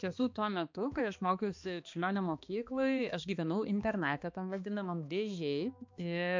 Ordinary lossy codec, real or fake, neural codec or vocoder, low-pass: MP3, 64 kbps; fake; codec, 16 kHz, 2 kbps, X-Codec, HuBERT features, trained on balanced general audio; 7.2 kHz